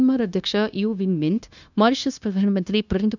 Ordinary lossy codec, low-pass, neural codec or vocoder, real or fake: none; 7.2 kHz; codec, 16 kHz, 0.9 kbps, LongCat-Audio-Codec; fake